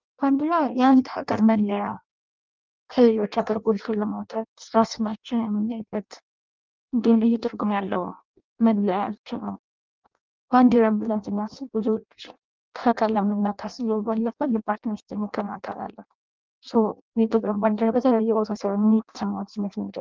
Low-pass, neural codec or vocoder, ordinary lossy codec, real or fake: 7.2 kHz; codec, 16 kHz in and 24 kHz out, 0.6 kbps, FireRedTTS-2 codec; Opus, 24 kbps; fake